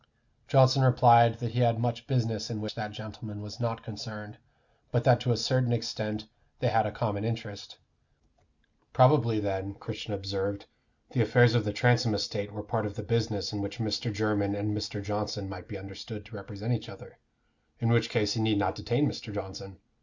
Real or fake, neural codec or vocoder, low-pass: real; none; 7.2 kHz